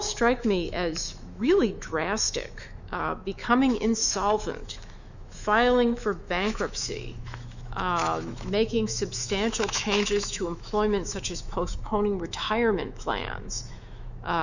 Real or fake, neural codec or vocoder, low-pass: fake; autoencoder, 48 kHz, 128 numbers a frame, DAC-VAE, trained on Japanese speech; 7.2 kHz